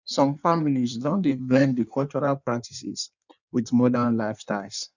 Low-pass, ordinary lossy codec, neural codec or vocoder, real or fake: 7.2 kHz; none; codec, 16 kHz in and 24 kHz out, 1.1 kbps, FireRedTTS-2 codec; fake